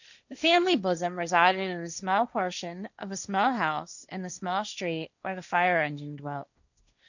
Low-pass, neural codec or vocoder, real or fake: 7.2 kHz; codec, 16 kHz, 1.1 kbps, Voila-Tokenizer; fake